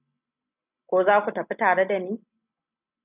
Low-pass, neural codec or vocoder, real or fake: 3.6 kHz; none; real